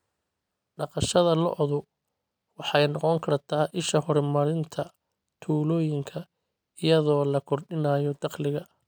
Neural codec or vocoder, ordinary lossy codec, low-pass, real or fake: none; none; none; real